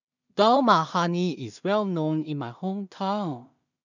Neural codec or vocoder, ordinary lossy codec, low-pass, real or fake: codec, 16 kHz in and 24 kHz out, 0.4 kbps, LongCat-Audio-Codec, two codebook decoder; none; 7.2 kHz; fake